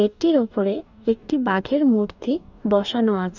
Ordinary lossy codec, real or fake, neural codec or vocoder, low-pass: none; fake; codec, 44.1 kHz, 2.6 kbps, DAC; 7.2 kHz